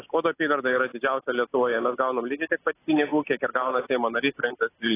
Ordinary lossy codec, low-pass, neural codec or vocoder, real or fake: AAC, 16 kbps; 3.6 kHz; none; real